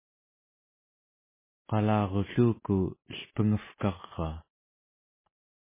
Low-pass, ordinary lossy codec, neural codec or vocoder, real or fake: 3.6 kHz; MP3, 16 kbps; none; real